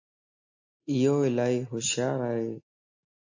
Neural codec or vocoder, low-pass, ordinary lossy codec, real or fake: none; 7.2 kHz; AAC, 48 kbps; real